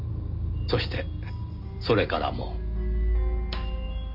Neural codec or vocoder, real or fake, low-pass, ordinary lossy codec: none; real; 5.4 kHz; MP3, 48 kbps